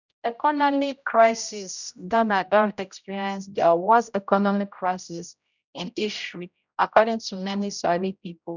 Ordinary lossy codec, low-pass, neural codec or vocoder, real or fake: none; 7.2 kHz; codec, 16 kHz, 0.5 kbps, X-Codec, HuBERT features, trained on general audio; fake